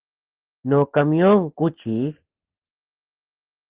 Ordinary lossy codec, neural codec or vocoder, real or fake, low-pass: Opus, 16 kbps; none; real; 3.6 kHz